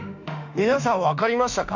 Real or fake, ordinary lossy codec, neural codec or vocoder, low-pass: fake; none; autoencoder, 48 kHz, 32 numbers a frame, DAC-VAE, trained on Japanese speech; 7.2 kHz